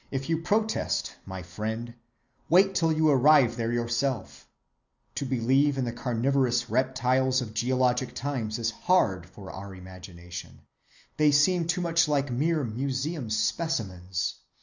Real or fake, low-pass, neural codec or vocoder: real; 7.2 kHz; none